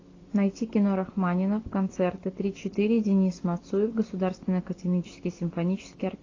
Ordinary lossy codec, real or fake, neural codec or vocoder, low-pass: AAC, 32 kbps; real; none; 7.2 kHz